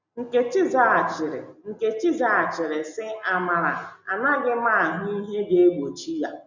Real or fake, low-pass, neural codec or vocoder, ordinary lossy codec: real; 7.2 kHz; none; none